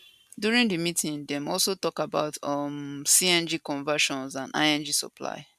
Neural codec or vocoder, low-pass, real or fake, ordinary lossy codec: none; 14.4 kHz; real; none